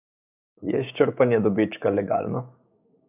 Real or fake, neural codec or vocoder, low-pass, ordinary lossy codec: fake; codec, 16 kHz, 6 kbps, DAC; 3.6 kHz; none